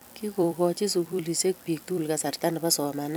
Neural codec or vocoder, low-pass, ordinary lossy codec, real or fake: vocoder, 44.1 kHz, 128 mel bands every 256 samples, BigVGAN v2; none; none; fake